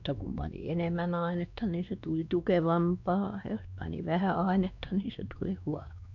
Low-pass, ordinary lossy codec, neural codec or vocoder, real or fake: 7.2 kHz; none; codec, 16 kHz, 2 kbps, X-Codec, WavLM features, trained on Multilingual LibriSpeech; fake